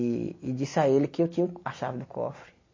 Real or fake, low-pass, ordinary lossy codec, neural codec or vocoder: real; 7.2 kHz; MP3, 32 kbps; none